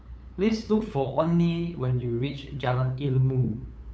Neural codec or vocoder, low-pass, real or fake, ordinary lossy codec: codec, 16 kHz, 8 kbps, FunCodec, trained on LibriTTS, 25 frames a second; none; fake; none